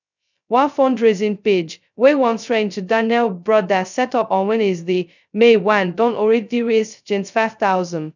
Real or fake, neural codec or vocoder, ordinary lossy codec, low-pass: fake; codec, 16 kHz, 0.2 kbps, FocalCodec; none; 7.2 kHz